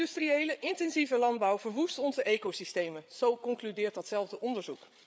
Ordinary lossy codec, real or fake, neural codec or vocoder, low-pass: none; fake; codec, 16 kHz, 8 kbps, FreqCodec, larger model; none